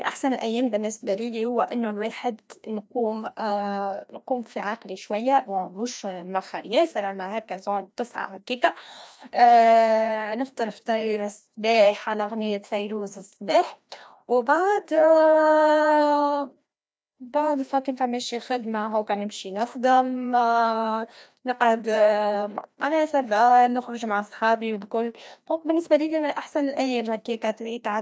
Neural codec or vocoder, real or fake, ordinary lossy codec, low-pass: codec, 16 kHz, 1 kbps, FreqCodec, larger model; fake; none; none